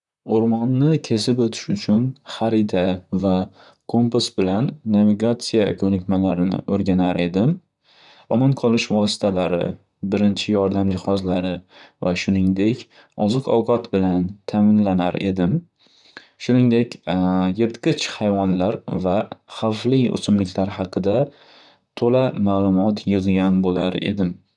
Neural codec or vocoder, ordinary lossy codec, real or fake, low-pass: vocoder, 44.1 kHz, 128 mel bands, Pupu-Vocoder; none; fake; 10.8 kHz